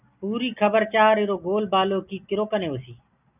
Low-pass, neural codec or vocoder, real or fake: 3.6 kHz; none; real